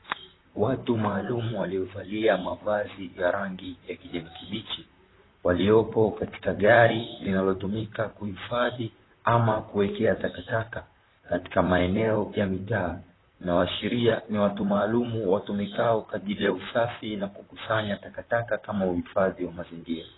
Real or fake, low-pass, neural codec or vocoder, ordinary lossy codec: fake; 7.2 kHz; vocoder, 44.1 kHz, 128 mel bands, Pupu-Vocoder; AAC, 16 kbps